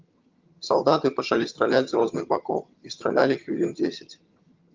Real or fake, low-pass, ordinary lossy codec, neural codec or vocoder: fake; 7.2 kHz; Opus, 32 kbps; vocoder, 22.05 kHz, 80 mel bands, HiFi-GAN